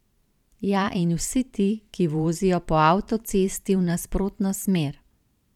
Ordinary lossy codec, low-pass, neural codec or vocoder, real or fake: none; 19.8 kHz; vocoder, 44.1 kHz, 128 mel bands every 512 samples, BigVGAN v2; fake